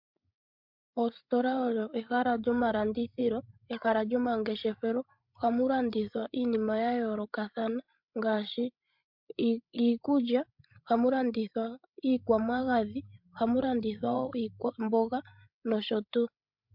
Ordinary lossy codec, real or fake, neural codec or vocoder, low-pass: MP3, 48 kbps; fake; codec, 16 kHz, 16 kbps, FreqCodec, larger model; 5.4 kHz